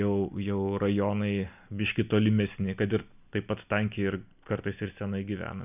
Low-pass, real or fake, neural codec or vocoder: 3.6 kHz; real; none